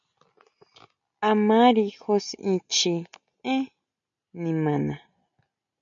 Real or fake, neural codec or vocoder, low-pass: real; none; 7.2 kHz